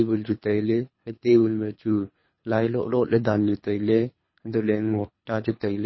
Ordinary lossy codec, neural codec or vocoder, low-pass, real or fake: MP3, 24 kbps; codec, 24 kHz, 3 kbps, HILCodec; 7.2 kHz; fake